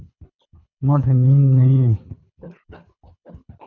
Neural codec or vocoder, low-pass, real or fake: codec, 24 kHz, 3 kbps, HILCodec; 7.2 kHz; fake